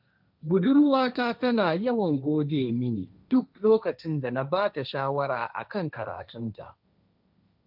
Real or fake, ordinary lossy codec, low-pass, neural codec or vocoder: fake; none; 5.4 kHz; codec, 16 kHz, 1.1 kbps, Voila-Tokenizer